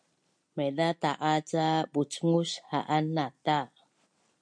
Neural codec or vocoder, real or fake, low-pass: none; real; 9.9 kHz